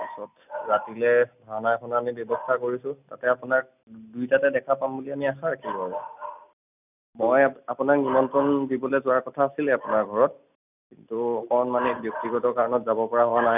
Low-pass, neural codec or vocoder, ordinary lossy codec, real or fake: 3.6 kHz; none; none; real